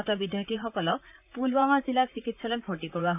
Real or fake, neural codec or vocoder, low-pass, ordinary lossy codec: fake; vocoder, 44.1 kHz, 128 mel bands, Pupu-Vocoder; 3.6 kHz; none